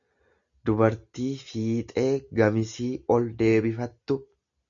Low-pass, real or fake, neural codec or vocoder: 7.2 kHz; real; none